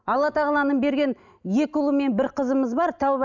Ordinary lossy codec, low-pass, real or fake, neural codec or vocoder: none; 7.2 kHz; real; none